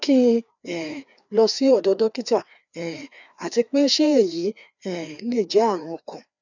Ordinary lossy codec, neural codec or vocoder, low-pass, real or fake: none; codec, 16 kHz, 2 kbps, FreqCodec, larger model; 7.2 kHz; fake